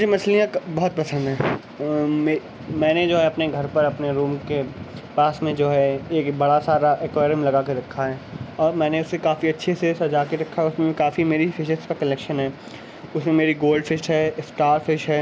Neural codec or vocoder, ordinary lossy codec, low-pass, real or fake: none; none; none; real